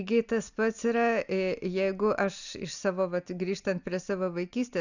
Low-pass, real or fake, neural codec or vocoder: 7.2 kHz; real; none